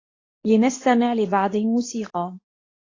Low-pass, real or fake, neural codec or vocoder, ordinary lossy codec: 7.2 kHz; fake; codec, 24 kHz, 0.9 kbps, WavTokenizer, medium speech release version 2; AAC, 32 kbps